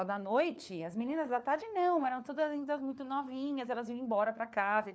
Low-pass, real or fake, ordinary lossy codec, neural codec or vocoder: none; fake; none; codec, 16 kHz, 4 kbps, FunCodec, trained on LibriTTS, 50 frames a second